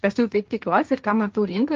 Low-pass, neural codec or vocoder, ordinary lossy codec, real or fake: 7.2 kHz; codec, 16 kHz, 1.1 kbps, Voila-Tokenizer; Opus, 32 kbps; fake